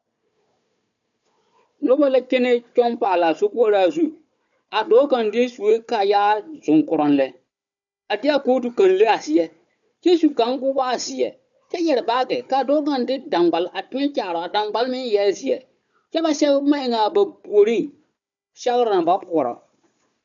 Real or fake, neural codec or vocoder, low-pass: fake; codec, 16 kHz, 4 kbps, FunCodec, trained on Chinese and English, 50 frames a second; 7.2 kHz